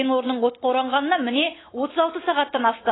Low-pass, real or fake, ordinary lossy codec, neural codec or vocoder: 7.2 kHz; real; AAC, 16 kbps; none